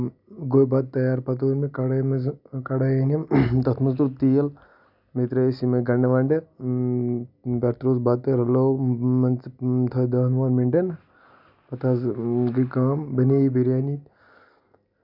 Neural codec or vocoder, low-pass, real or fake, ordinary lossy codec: none; 5.4 kHz; real; none